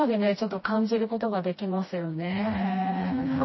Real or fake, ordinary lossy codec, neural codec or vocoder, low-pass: fake; MP3, 24 kbps; codec, 16 kHz, 1 kbps, FreqCodec, smaller model; 7.2 kHz